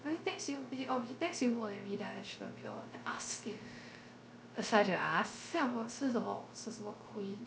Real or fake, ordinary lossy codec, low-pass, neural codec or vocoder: fake; none; none; codec, 16 kHz, 0.3 kbps, FocalCodec